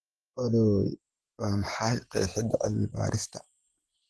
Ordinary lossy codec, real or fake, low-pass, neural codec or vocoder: Opus, 16 kbps; fake; 10.8 kHz; vocoder, 48 kHz, 128 mel bands, Vocos